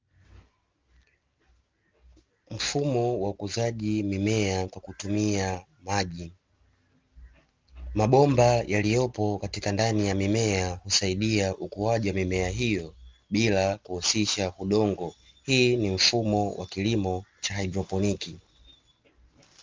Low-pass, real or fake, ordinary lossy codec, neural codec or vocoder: 7.2 kHz; real; Opus, 32 kbps; none